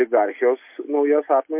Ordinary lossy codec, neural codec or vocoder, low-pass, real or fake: MP3, 24 kbps; none; 3.6 kHz; real